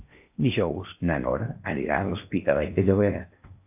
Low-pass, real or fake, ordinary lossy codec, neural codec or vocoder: 3.6 kHz; fake; AAC, 32 kbps; codec, 16 kHz in and 24 kHz out, 0.8 kbps, FocalCodec, streaming, 65536 codes